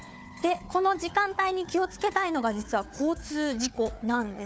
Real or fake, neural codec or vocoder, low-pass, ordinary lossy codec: fake; codec, 16 kHz, 4 kbps, FunCodec, trained on Chinese and English, 50 frames a second; none; none